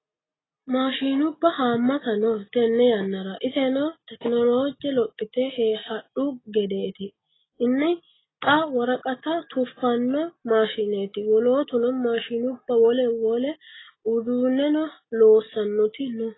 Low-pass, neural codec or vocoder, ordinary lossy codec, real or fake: 7.2 kHz; none; AAC, 16 kbps; real